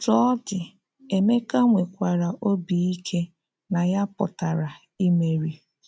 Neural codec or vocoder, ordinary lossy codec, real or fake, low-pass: none; none; real; none